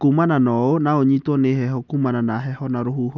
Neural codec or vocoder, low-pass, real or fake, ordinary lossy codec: none; 7.2 kHz; real; none